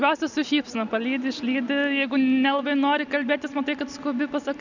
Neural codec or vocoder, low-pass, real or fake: none; 7.2 kHz; real